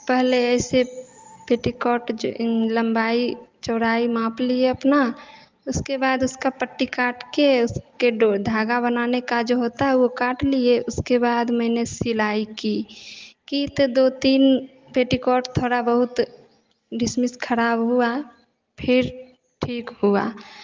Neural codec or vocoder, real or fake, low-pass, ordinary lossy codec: none; real; 7.2 kHz; Opus, 32 kbps